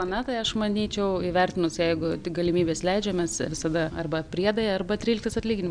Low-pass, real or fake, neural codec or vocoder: 9.9 kHz; real; none